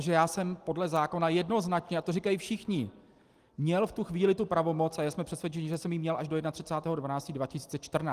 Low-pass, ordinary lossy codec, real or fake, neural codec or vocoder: 14.4 kHz; Opus, 24 kbps; real; none